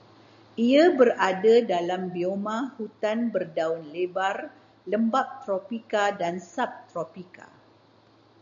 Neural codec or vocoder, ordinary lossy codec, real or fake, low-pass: none; MP3, 64 kbps; real; 7.2 kHz